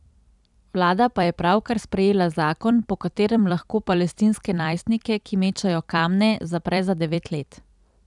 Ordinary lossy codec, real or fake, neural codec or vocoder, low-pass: none; fake; vocoder, 44.1 kHz, 128 mel bands every 256 samples, BigVGAN v2; 10.8 kHz